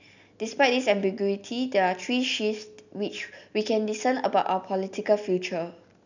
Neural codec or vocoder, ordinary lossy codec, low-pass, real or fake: none; none; 7.2 kHz; real